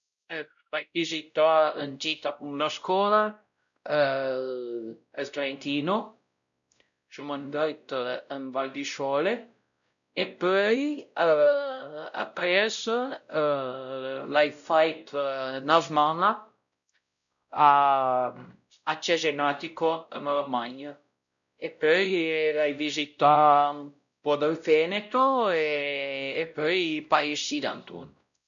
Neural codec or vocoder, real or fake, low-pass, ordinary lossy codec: codec, 16 kHz, 0.5 kbps, X-Codec, WavLM features, trained on Multilingual LibriSpeech; fake; 7.2 kHz; none